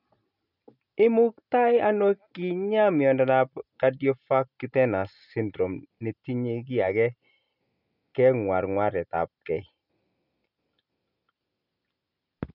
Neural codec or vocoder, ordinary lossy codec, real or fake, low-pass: none; none; real; 5.4 kHz